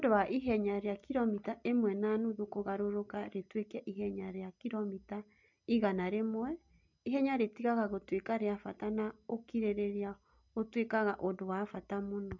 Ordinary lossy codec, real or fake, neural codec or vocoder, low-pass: MP3, 64 kbps; real; none; 7.2 kHz